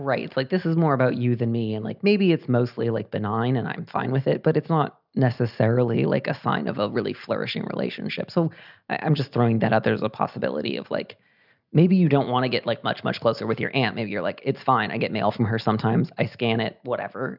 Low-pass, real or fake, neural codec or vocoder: 5.4 kHz; real; none